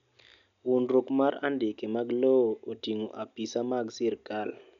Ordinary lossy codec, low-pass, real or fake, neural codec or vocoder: none; 7.2 kHz; real; none